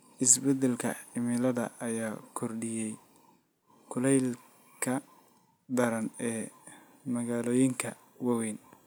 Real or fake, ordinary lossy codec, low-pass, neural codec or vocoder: real; none; none; none